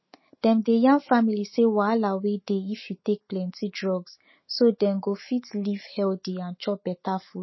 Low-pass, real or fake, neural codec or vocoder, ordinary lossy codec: 7.2 kHz; fake; autoencoder, 48 kHz, 128 numbers a frame, DAC-VAE, trained on Japanese speech; MP3, 24 kbps